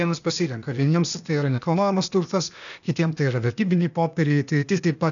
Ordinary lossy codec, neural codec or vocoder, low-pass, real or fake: MP3, 96 kbps; codec, 16 kHz, 0.8 kbps, ZipCodec; 7.2 kHz; fake